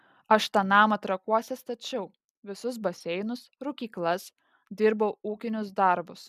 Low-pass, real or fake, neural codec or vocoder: 14.4 kHz; real; none